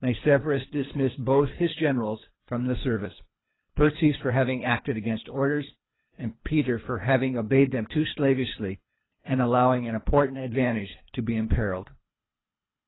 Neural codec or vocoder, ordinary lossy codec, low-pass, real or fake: codec, 24 kHz, 3 kbps, HILCodec; AAC, 16 kbps; 7.2 kHz; fake